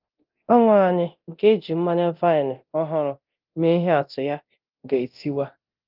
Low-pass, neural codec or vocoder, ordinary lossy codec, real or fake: 5.4 kHz; codec, 24 kHz, 0.9 kbps, DualCodec; Opus, 24 kbps; fake